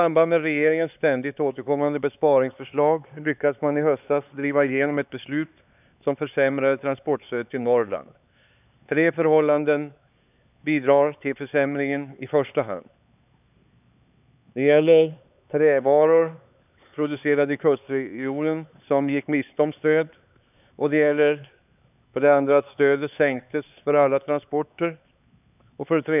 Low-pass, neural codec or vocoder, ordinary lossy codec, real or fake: 3.6 kHz; codec, 16 kHz, 4 kbps, X-Codec, HuBERT features, trained on LibriSpeech; none; fake